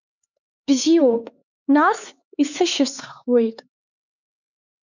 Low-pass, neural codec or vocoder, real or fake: 7.2 kHz; codec, 16 kHz, 4 kbps, X-Codec, HuBERT features, trained on LibriSpeech; fake